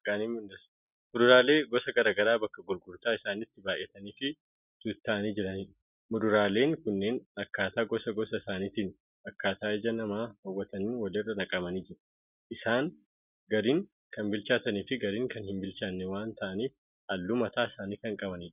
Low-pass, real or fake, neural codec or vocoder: 3.6 kHz; real; none